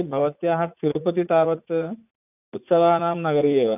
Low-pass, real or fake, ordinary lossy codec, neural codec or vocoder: 3.6 kHz; fake; none; vocoder, 44.1 kHz, 80 mel bands, Vocos